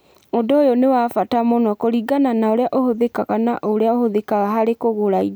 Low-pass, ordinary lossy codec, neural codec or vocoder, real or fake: none; none; none; real